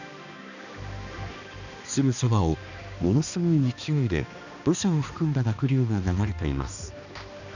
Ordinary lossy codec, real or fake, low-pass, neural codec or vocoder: none; fake; 7.2 kHz; codec, 16 kHz, 2 kbps, X-Codec, HuBERT features, trained on balanced general audio